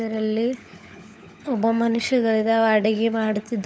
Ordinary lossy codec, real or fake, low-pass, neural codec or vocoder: none; fake; none; codec, 16 kHz, 16 kbps, FunCodec, trained on LibriTTS, 50 frames a second